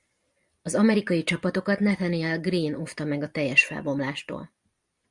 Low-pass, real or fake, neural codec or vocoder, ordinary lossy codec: 10.8 kHz; fake; vocoder, 44.1 kHz, 128 mel bands every 256 samples, BigVGAN v2; Opus, 64 kbps